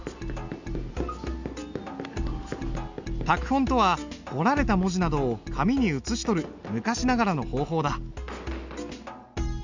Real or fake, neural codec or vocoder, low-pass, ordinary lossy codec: real; none; 7.2 kHz; Opus, 64 kbps